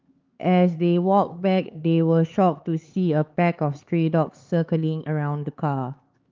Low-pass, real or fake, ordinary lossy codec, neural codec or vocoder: 7.2 kHz; fake; Opus, 24 kbps; codec, 16 kHz, 4 kbps, X-Codec, HuBERT features, trained on LibriSpeech